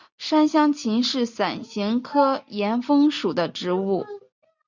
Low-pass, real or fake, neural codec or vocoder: 7.2 kHz; real; none